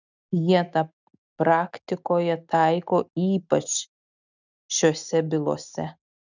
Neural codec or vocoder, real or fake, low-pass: none; real; 7.2 kHz